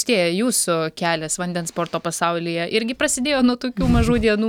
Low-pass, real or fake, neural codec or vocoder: 19.8 kHz; real; none